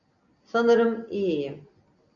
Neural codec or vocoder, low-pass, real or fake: none; 7.2 kHz; real